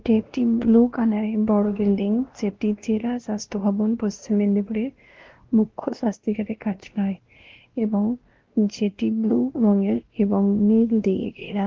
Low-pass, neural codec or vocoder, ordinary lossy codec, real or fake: 7.2 kHz; codec, 16 kHz, 1 kbps, X-Codec, WavLM features, trained on Multilingual LibriSpeech; Opus, 16 kbps; fake